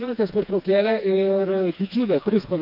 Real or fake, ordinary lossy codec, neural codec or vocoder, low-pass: fake; AAC, 48 kbps; codec, 16 kHz, 2 kbps, FreqCodec, smaller model; 5.4 kHz